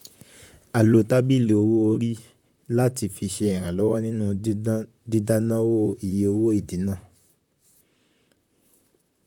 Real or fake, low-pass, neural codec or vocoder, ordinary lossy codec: fake; 19.8 kHz; vocoder, 44.1 kHz, 128 mel bands, Pupu-Vocoder; none